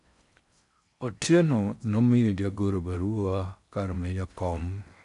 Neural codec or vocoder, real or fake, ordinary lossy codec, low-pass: codec, 16 kHz in and 24 kHz out, 0.6 kbps, FocalCodec, streaming, 4096 codes; fake; none; 10.8 kHz